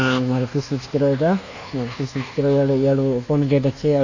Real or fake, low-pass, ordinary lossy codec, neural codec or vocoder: fake; 7.2 kHz; none; codec, 24 kHz, 1.2 kbps, DualCodec